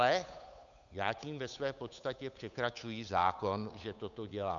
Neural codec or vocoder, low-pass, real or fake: codec, 16 kHz, 8 kbps, FunCodec, trained on Chinese and English, 25 frames a second; 7.2 kHz; fake